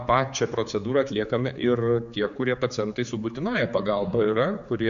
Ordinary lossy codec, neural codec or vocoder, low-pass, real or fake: MP3, 64 kbps; codec, 16 kHz, 4 kbps, X-Codec, HuBERT features, trained on general audio; 7.2 kHz; fake